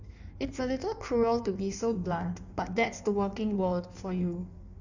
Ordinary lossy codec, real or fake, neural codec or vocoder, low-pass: none; fake; codec, 16 kHz in and 24 kHz out, 1.1 kbps, FireRedTTS-2 codec; 7.2 kHz